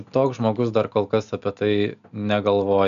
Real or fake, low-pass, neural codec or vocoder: real; 7.2 kHz; none